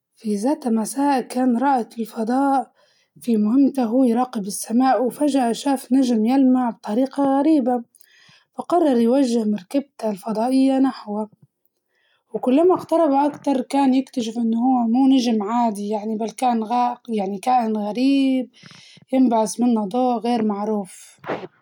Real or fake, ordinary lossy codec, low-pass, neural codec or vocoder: real; none; 19.8 kHz; none